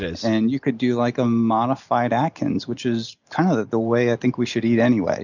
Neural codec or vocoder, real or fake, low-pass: none; real; 7.2 kHz